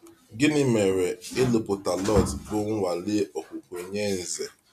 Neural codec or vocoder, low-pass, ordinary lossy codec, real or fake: none; 14.4 kHz; MP3, 96 kbps; real